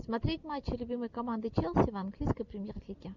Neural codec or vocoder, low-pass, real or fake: none; 7.2 kHz; real